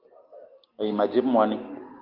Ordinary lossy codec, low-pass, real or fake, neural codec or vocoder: Opus, 32 kbps; 5.4 kHz; real; none